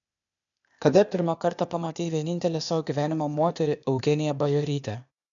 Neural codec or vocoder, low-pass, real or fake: codec, 16 kHz, 0.8 kbps, ZipCodec; 7.2 kHz; fake